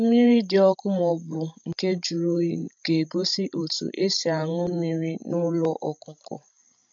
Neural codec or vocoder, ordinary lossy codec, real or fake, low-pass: codec, 16 kHz, 16 kbps, FreqCodec, larger model; MP3, 64 kbps; fake; 7.2 kHz